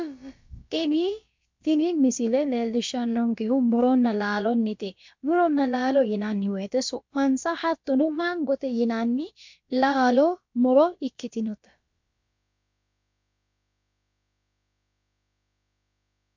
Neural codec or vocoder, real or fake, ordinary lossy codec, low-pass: codec, 16 kHz, about 1 kbps, DyCAST, with the encoder's durations; fake; MP3, 64 kbps; 7.2 kHz